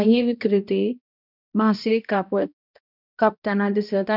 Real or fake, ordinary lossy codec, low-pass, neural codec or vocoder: fake; none; 5.4 kHz; codec, 16 kHz, 1 kbps, X-Codec, HuBERT features, trained on balanced general audio